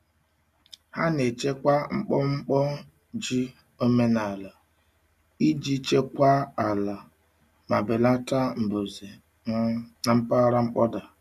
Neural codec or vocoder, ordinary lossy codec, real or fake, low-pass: none; none; real; 14.4 kHz